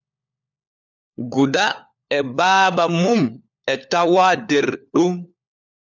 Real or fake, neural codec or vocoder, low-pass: fake; codec, 16 kHz, 4 kbps, FunCodec, trained on LibriTTS, 50 frames a second; 7.2 kHz